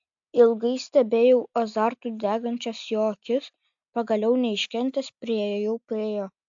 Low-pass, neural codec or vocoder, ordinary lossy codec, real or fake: 7.2 kHz; none; AAC, 64 kbps; real